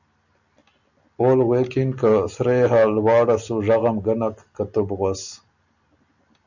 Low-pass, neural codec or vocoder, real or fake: 7.2 kHz; none; real